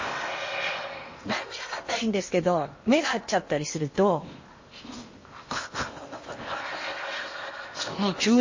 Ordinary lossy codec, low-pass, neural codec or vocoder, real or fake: MP3, 32 kbps; 7.2 kHz; codec, 16 kHz in and 24 kHz out, 0.8 kbps, FocalCodec, streaming, 65536 codes; fake